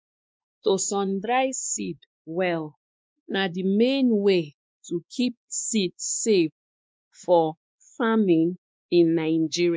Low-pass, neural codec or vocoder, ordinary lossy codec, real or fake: none; codec, 16 kHz, 2 kbps, X-Codec, WavLM features, trained on Multilingual LibriSpeech; none; fake